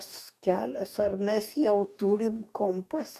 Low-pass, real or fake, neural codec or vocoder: 14.4 kHz; fake; codec, 44.1 kHz, 2.6 kbps, DAC